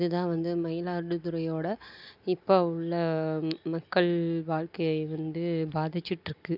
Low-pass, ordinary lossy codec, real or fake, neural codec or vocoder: 5.4 kHz; none; real; none